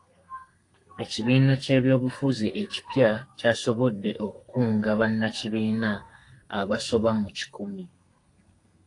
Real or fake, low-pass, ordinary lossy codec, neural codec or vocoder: fake; 10.8 kHz; AAC, 48 kbps; codec, 32 kHz, 1.9 kbps, SNAC